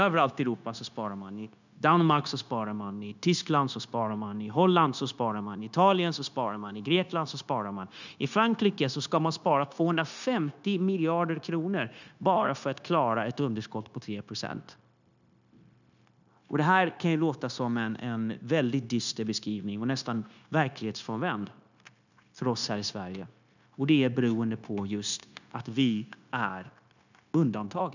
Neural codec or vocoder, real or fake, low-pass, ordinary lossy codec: codec, 16 kHz, 0.9 kbps, LongCat-Audio-Codec; fake; 7.2 kHz; none